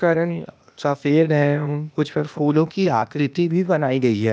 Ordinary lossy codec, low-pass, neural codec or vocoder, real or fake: none; none; codec, 16 kHz, 0.8 kbps, ZipCodec; fake